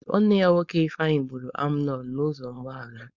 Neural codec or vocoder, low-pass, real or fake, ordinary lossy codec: codec, 16 kHz, 4.8 kbps, FACodec; 7.2 kHz; fake; none